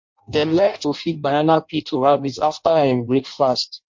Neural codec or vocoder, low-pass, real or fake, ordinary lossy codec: codec, 16 kHz in and 24 kHz out, 0.6 kbps, FireRedTTS-2 codec; 7.2 kHz; fake; MP3, 48 kbps